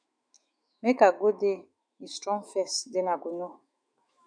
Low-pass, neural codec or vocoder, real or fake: 9.9 kHz; autoencoder, 48 kHz, 128 numbers a frame, DAC-VAE, trained on Japanese speech; fake